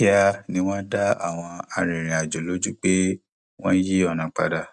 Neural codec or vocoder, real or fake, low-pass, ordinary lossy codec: none; real; 10.8 kHz; none